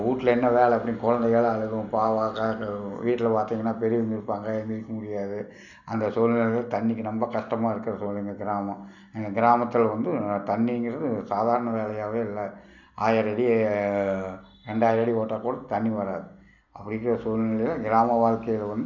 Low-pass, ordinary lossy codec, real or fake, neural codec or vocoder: 7.2 kHz; none; real; none